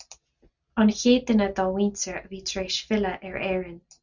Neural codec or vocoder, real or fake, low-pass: none; real; 7.2 kHz